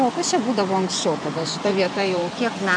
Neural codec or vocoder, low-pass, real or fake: vocoder, 24 kHz, 100 mel bands, Vocos; 9.9 kHz; fake